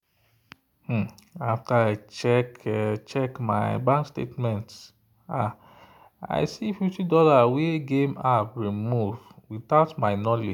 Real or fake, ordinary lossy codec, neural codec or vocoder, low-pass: real; none; none; 19.8 kHz